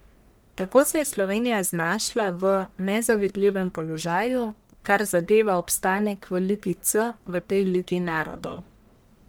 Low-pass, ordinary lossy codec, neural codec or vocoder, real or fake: none; none; codec, 44.1 kHz, 1.7 kbps, Pupu-Codec; fake